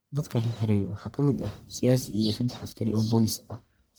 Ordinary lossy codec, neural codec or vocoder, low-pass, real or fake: none; codec, 44.1 kHz, 1.7 kbps, Pupu-Codec; none; fake